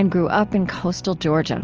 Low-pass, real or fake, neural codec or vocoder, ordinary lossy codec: 7.2 kHz; real; none; Opus, 24 kbps